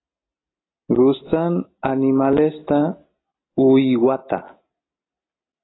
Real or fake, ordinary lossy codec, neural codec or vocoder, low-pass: real; AAC, 16 kbps; none; 7.2 kHz